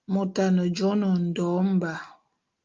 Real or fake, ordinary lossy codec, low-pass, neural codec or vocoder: real; Opus, 32 kbps; 7.2 kHz; none